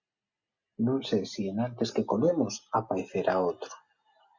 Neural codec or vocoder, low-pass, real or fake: none; 7.2 kHz; real